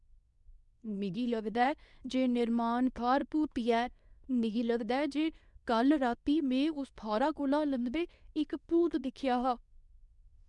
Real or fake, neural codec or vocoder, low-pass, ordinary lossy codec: fake; codec, 24 kHz, 0.9 kbps, WavTokenizer, medium speech release version 2; 10.8 kHz; none